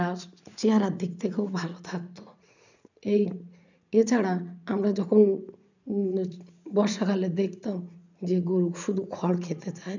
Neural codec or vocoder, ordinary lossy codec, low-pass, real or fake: vocoder, 44.1 kHz, 80 mel bands, Vocos; none; 7.2 kHz; fake